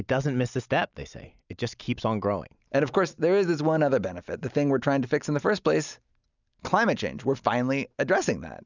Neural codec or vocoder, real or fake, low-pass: none; real; 7.2 kHz